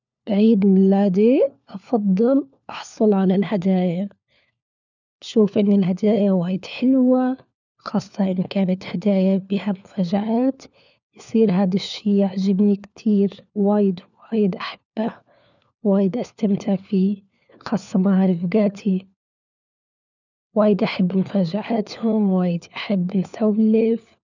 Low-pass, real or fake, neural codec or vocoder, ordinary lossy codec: 7.2 kHz; fake; codec, 16 kHz, 4 kbps, FunCodec, trained on LibriTTS, 50 frames a second; none